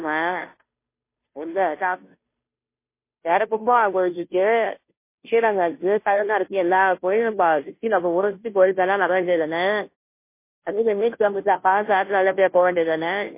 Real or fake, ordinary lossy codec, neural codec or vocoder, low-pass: fake; MP3, 24 kbps; codec, 16 kHz, 0.5 kbps, FunCodec, trained on Chinese and English, 25 frames a second; 3.6 kHz